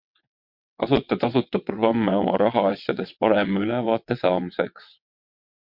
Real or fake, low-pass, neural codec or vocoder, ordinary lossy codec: fake; 5.4 kHz; vocoder, 22.05 kHz, 80 mel bands, WaveNeXt; MP3, 48 kbps